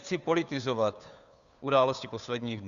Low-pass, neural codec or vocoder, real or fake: 7.2 kHz; codec, 16 kHz, 2 kbps, FunCodec, trained on Chinese and English, 25 frames a second; fake